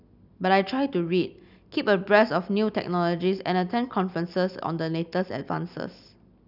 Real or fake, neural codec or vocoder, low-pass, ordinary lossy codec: real; none; 5.4 kHz; none